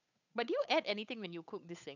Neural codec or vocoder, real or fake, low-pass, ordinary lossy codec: codec, 16 kHz, 8 kbps, FunCodec, trained on Chinese and English, 25 frames a second; fake; 7.2 kHz; none